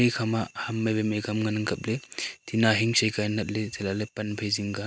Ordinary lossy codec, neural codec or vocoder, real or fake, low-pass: none; none; real; none